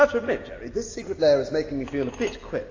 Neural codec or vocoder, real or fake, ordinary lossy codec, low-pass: none; real; AAC, 32 kbps; 7.2 kHz